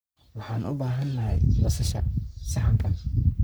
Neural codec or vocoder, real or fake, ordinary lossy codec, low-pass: codec, 44.1 kHz, 3.4 kbps, Pupu-Codec; fake; none; none